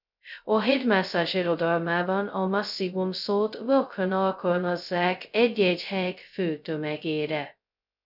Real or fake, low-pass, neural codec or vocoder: fake; 5.4 kHz; codec, 16 kHz, 0.2 kbps, FocalCodec